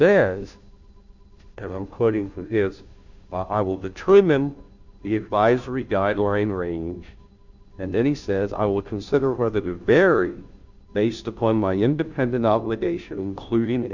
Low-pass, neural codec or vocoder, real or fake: 7.2 kHz; codec, 16 kHz, 0.5 kbps, FunCodec, trained on Chinese and English, 25 frames a second; fake